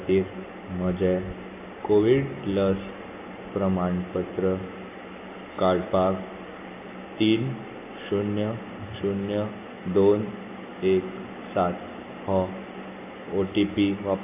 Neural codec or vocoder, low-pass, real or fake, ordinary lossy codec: none; 3.6 kHz; real; AAC, 32 kbps